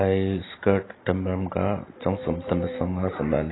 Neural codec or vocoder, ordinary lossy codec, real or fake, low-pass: none; AAC, 16 kbps; real; 7.2 kHz